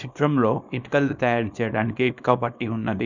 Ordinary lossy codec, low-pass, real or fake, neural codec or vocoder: none; 7.2 kHz; fake; codec, 24 kHz, 0.9 kbps, WavTokenizer, small release